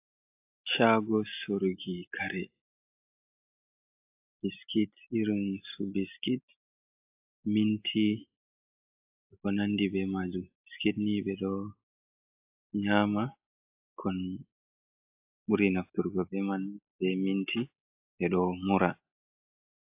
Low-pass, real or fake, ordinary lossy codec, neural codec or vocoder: 3.6 kHz; real; AAC, 32 kbps; none